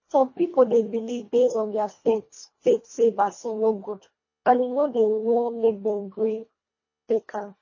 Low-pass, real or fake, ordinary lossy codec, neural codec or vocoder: 7.2 kHz; fake; MP3, 32 kbps; codec, 24 kHz, 1.5 kbps, HILCodec